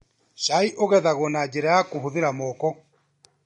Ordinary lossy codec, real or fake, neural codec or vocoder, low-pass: MP3, 48 kbps; real; none; 10.8 kHz